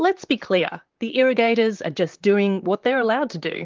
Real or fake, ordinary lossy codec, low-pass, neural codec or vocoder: fake; Opus, 32 kbps; 7.2 kHz; vocoder, 44.1 kHz, 128 mel bands, Pupu-Vocoder